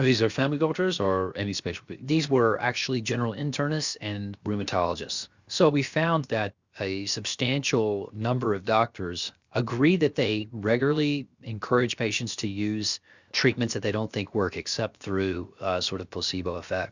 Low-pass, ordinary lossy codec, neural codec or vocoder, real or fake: 7.2 kHz; Opus, 64 kbps; codec, 16 kHz, 0.7 kbps, FocalCodec; fake